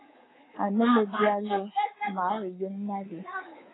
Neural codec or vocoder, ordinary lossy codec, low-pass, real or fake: codec, 24 kHz, 3.1 kbps, DualCodec; AAC, 16 kbps; 7.2 kHz; fake